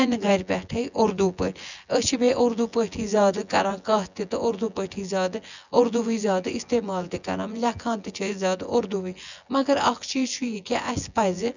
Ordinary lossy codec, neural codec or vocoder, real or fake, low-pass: none; vocoder, 24 kHz, 100 mel bands, Vocos; fake; 7.2 kHz